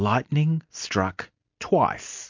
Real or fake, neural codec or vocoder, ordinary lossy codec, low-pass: real; none; MP3, 48 kbps; 7.2 kHz